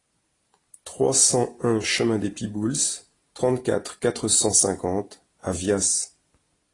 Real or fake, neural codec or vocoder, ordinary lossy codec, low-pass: real; none; AAC, 32 kbps; 10.8 kHz